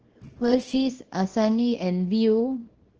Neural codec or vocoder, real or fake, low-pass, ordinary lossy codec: codec, 24 kHz, 0.9 kbps, WavTokenizer, small release; fake; 7.2 kHz; Opus, 16 kbps